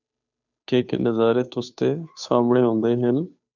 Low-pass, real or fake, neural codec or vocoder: 7.2 kHz; fake; codec, 16 kHz, 2 kbps, FunCodec, trained on Chinese and English, 25 frames a second